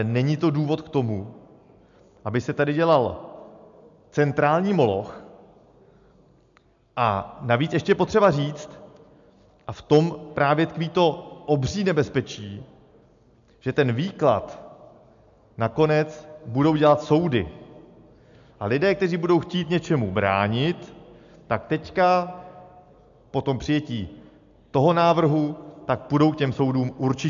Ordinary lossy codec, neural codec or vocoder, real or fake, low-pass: MP3, 64 kbps; none; real; 7.2 kHz